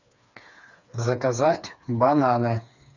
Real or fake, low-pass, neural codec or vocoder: fake; 7.2 kHz; codec, 16 kHz, 4 kbps, FreqCodec, smaller model